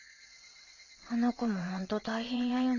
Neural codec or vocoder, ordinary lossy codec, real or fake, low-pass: vocoder, 22.05 kHz, 80 mel bands, WaveNeXt; none; fake; 7.2 kHz